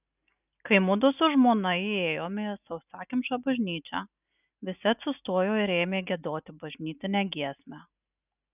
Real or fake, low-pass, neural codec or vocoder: real; 3.6 kHz; none